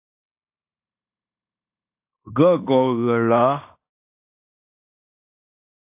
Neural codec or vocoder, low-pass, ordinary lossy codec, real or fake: codec, 16 kHz in and 24 kHz out, 0.9 kbps, LongCat-Audio-Codec, fine tuned four codebook decoder; 3.6 kHz; AAC, 32 kbps; fake